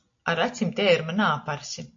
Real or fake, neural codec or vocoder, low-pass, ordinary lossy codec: real; none; 7.2 kHz; AAC, 48 kbps